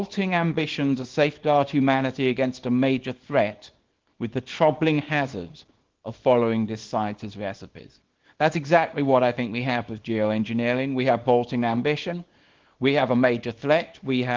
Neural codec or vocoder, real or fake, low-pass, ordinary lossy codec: codec, 24 kHz, 0.9 kbps, WavTokenizer, small release; fake; 7.2 kHz; Opus, 16 kbps